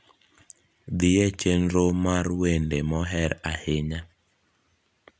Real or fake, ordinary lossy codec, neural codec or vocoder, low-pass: real; none; none; none